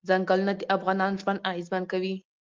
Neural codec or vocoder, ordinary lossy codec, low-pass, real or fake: none; Opus, 32 kbps; 7.2 kHz; real